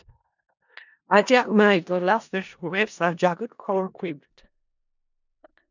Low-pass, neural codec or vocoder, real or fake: 7.2 kHz; codec, 16 kHz in and 24 kHz out, 0.4 kbps, LongCat-Audio-Codec, four codebook decoder; fake